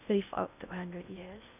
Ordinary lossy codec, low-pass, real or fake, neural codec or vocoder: none; 3.6 kHz; fake; codec, 16 kHz in and 24 kHz out, 0.6 kbps, FocalCodec, streaming, 2048 codes